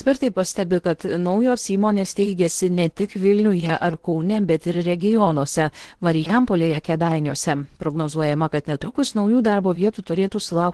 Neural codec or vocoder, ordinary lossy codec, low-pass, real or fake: codec, 16 kHz in and 24 kHz out, 0.8 kbps, FocalCodec, streaming, 65536 codes; Opus, 16 kbps; 10.8 kHz; fake